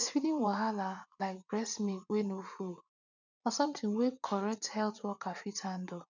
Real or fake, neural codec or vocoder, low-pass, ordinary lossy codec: fake; vocoder, 44.1 kHz, 80 mel bands, Vocos; 7.2 kHz; none